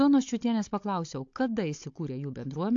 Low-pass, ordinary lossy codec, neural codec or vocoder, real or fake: 7.2 kHz; AAC, 48 kbps; codec, 16 kHz, 16 kbps, FunCodec, trained on Chinese and English, 50 frames a second; fake